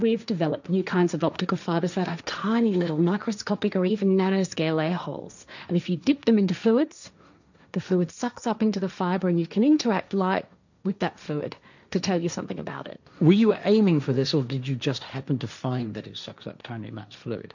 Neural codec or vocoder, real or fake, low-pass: codec, 16 kHz, 1.1 kbps, Voila-Tokenizer; fake; 7.2 kHz